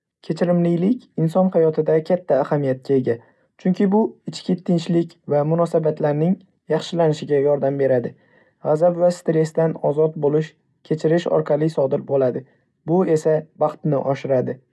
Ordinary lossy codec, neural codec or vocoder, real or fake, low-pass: none; none; real; none